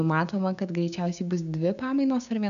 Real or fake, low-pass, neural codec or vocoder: fake; 7.2 kHz; codec, 16 kHz, 6 kbps, DAC